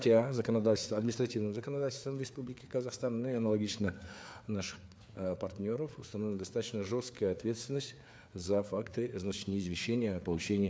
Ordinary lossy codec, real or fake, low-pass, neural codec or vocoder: none; fake; none; codec, 16 kHz, 4 kbps, FreqCodec, larger model